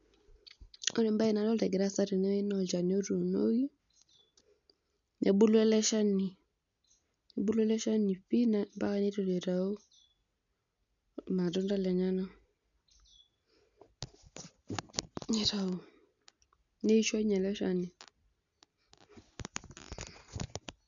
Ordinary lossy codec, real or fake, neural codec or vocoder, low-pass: none; real; none; 7.2 kHz